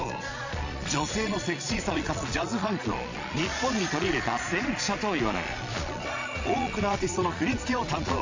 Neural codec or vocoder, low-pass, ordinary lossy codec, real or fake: vocoder, 22.05 kHz, 80 mel bands, Vocos; 7.2 kHz; AAC, 48 kbps; fake